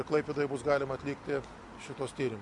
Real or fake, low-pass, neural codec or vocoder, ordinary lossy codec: real; 10.8 kHz; none; MP3, 64 kbps